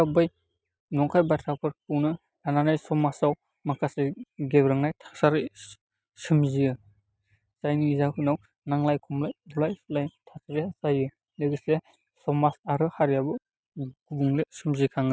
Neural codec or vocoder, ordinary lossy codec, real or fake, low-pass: none; none; real; none